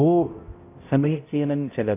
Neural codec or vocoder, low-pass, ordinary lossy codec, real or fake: codec, 16 kHz, 0.5 kbps, X-Codec, HuBERT features, trained on balanced general audio; 3.6 kHz; AAC, 24 kbps; fake